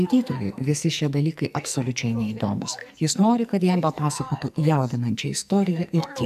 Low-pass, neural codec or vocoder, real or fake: 14.4 kHz; codec, 44.1 kHz, 2.6 kbps, SNAC; fake